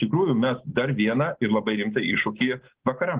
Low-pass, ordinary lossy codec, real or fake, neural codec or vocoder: 3.6 kHz; Opus, 16 kbps; real; none